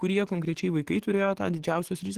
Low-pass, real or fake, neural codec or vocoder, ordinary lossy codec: 14.4 kHz; fake; codec, 44.1 kHz, 7.8 kbps, DAC; Opus, 16 kbps